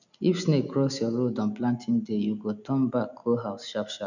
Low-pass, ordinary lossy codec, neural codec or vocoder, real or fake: 7.2 kHz; none; none; real